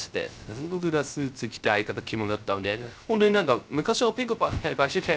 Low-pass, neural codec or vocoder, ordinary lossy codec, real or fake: none; codec, 16 kHz, 0.3 kbps, FocalCodec; none; fake